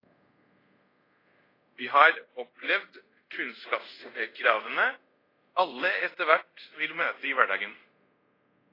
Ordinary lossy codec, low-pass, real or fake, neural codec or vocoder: AAC, 24 kbps; 5.4 kHz; fake; codec, 24 kHz, 0.5 kbps, DualCodec